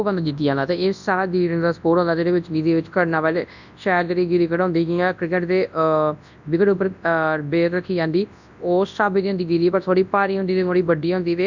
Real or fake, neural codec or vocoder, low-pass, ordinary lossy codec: fake; codec, 24 kHz, 0.9 kbps, WavTokenizer, large speech release; 7.2 kHz; none